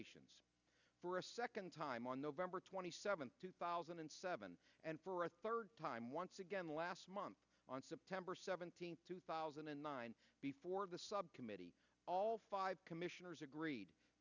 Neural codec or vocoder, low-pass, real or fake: none; 7.2 kHz; real